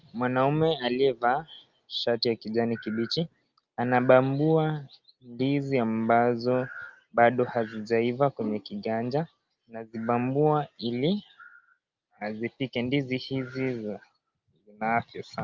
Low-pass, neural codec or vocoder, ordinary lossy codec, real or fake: 7.2 kHz; none; Opus, 24 kbps; real